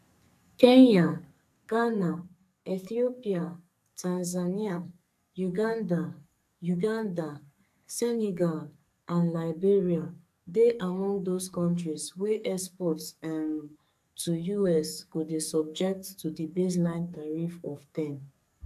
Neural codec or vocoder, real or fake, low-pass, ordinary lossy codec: codec, 44.1 kHz, 2.6 kbps, SNAC; fake; 14.4 kHz; AAC, 96 kbps